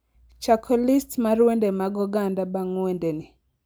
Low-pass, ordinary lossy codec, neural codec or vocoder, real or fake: none; none; none; real